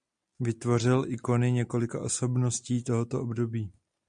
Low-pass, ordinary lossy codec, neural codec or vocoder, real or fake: 9.9 kHz; Opus, 64 kbps; none; real